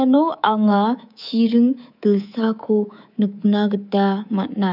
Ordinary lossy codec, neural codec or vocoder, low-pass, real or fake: none; codec, 24 kHz, 3.1 kbps, DualCodec; 5.4 kHz; fake